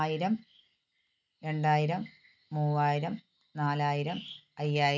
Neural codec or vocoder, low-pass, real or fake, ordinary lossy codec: none; 7.2 kHz; real; none